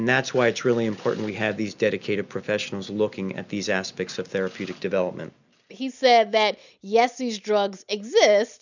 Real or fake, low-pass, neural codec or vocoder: real; 7.2 kHz; none